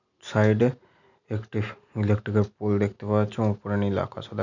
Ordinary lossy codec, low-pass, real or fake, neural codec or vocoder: none; 7.2 kHz; real; none